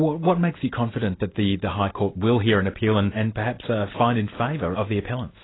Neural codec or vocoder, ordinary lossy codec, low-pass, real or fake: none; AAC, 16 kbps; 7.2 kHz; real